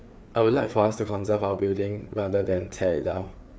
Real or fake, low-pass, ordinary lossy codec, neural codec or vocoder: fake; none; none; codec, 16 kHz, 4 kbps, FreqCodec, larger model